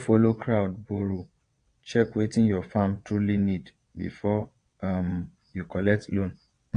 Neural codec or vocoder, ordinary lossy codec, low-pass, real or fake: vocoder, 22.05 kHz, 80 mel bands, WaveNeXt; AAC, 48 kbps; 9.9 kHz; fake